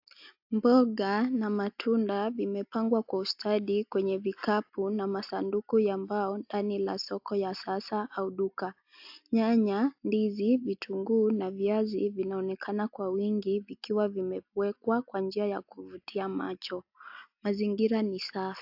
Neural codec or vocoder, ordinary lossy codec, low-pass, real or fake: none; AAC, 48 kbps; 5.4 kHz; real